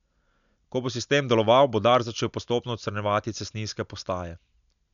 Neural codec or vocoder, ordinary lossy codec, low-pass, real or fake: none; none; 7.2 kHz; real